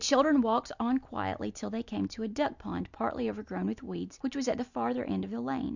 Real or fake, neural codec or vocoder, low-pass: real; none; 7.2 kHz